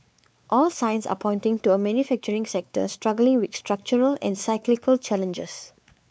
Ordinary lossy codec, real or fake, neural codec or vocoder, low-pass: none; fake; codec, 16 kHz, 4 kbps, X-Codec, WavLM features, trained on Multilingual LibriSpeech; none